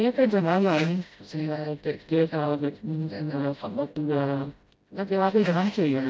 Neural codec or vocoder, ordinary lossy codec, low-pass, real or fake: codec, 16 kHz, 0.5 kbps, FreqCodec, smaller model; none; none; fake